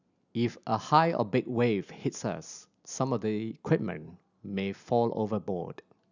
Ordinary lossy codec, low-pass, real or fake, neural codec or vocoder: none; 7.2 kHz; real; none